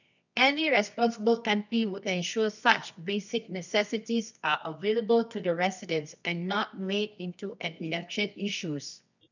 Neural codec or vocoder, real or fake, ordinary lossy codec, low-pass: codec, 24 kHz, 0.9 kbps, WavTokenizer, medium music audio release; fake; none; 7.2 kHz